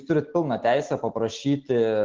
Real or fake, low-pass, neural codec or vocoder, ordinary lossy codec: real; 7.2 kHz; none; Opus, 16 kbps